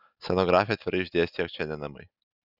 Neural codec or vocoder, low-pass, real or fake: none; 5.4 kHz; real